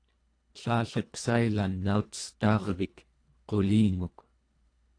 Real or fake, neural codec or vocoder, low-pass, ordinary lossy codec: fake; codec, 24 kHz, 1.5 kbps, HILCodec; 9.9 kHz; AAC, 48 kbps